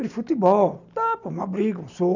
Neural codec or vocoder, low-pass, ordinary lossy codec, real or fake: none; 7.2 kHz; none; real